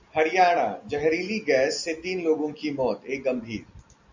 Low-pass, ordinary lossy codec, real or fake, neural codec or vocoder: 7.2 kHz; MP3, 64 kbps; real; none